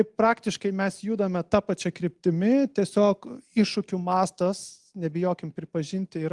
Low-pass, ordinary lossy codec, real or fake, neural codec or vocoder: 10.8 kHz; Opus, 24 kbps; real; none